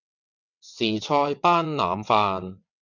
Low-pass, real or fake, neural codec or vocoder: 7.2 kHz; fake; vocoder, 22.05 kHz, 80 mel bands, WaveNeXt